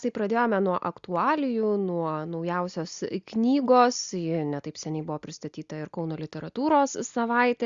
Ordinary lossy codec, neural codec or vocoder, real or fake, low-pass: Opus, 64 kbps; none; real; 7.2 kHz